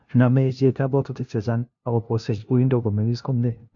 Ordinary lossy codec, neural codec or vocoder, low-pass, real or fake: MP3, 48 kbps; codec, 16 kHz, 0.5 kbps, FunCodec, trained on LibriTTS, 25 frames a second; 7.2 kHz; fake